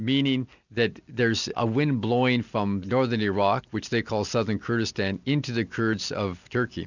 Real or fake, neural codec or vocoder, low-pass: real; none; 7.2 kHz